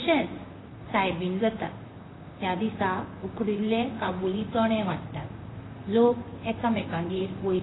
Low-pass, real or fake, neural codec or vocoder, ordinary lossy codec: 7.2 kHz; fake; vocoder, 44.1 kHz, 128 mel bands, Pupu-Vocoder; AAC, 16 kbps